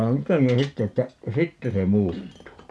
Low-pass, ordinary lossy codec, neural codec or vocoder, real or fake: none; none; none; real